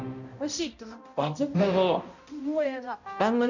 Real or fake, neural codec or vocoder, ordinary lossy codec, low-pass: fake; codec, 16 kHz, 0.5 kbps, X-Codec, HuBERT features, trained on balanced general audio; none; 7.2 kHz